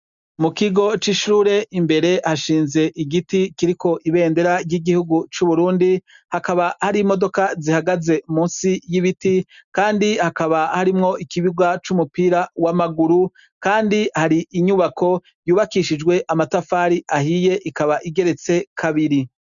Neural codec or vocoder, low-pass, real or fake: none; 7.2 kHz; real